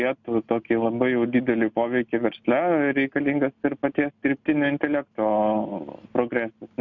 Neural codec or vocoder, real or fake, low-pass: none; real; 7.2 kHz